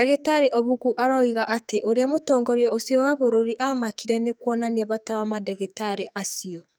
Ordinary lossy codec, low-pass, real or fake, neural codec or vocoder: none; none; fake; codec, 44.1 kHz, 2.6 kbps, SNAC